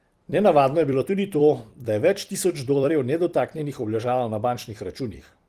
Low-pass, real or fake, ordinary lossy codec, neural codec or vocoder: 14.4 kHz; fake; Opus, 24 kbps; vocoder, 44.1 kHz, 128 mel bands every 256 samples, BigVGAN v2